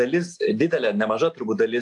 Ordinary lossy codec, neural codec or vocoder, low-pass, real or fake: AAC, 64 kbps; none; 10.8 kHz; real